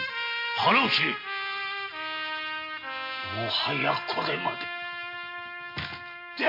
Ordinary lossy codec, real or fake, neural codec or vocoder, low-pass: MP3, 32 kbps; real; none; 5.4 kHz